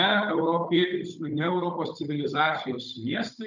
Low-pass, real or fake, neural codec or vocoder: 7.2 kHz; fake; codec, 16 kHz, 16 kbps, FunCodec, trained on Chinese and English, 50 frames a second